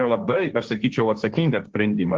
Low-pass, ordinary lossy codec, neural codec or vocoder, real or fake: 7.2 kHz; Opus, 16 kbps; codec, 16 kHz, 1.1 kbps, Voila-Tokenizer; fake